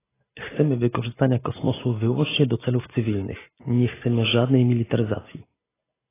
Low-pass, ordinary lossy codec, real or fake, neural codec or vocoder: 3.6 kHz; AAC, 16 kbps; real; none